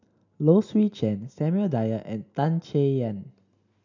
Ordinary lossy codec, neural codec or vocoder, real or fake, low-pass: none; none; real; 7.2 kHz